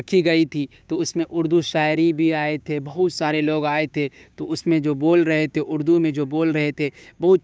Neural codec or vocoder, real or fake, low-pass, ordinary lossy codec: codec, 16 kHz, 6 kbps, DAC; fake; none; none